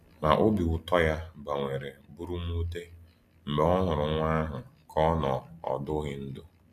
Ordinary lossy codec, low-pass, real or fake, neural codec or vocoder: none; 14.4 kHz; real; none